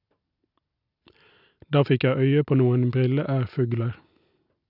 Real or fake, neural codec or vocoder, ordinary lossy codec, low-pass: real; none; none; 5.4 kHz